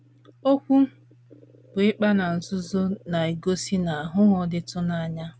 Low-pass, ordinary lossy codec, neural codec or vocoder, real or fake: none; none; none; real